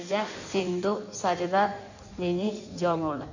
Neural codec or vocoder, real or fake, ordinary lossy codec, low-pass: codec, 16 kHz in and 24 kHz out, 1.1 kbps, FireRedTTS-2 codec; fake; none; 7.2 kHz